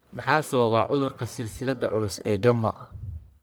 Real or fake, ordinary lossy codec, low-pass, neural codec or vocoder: fake; none; none; codec, 44.1 kHz, 1.7 kbps, Pupu-Codec